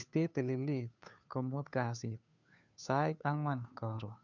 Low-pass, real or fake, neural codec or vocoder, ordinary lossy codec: 7.2 kHz; fake; codec, 16 kHz, 2 kbps, FunCodec, trained on Chinese and English, 25 frames a second; none